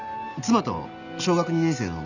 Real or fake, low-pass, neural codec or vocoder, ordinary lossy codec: real; 7.2 kHz; none; none